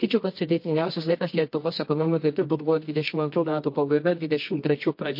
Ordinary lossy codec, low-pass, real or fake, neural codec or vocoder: MP3, 32 kbps; 5.4 kHz; fake; codec, 24 kHz, 0.9 kbps, WavTokenizer, medium music audio release